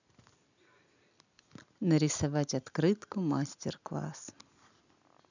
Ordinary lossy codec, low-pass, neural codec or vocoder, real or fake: none; 7.2 kHz; none; real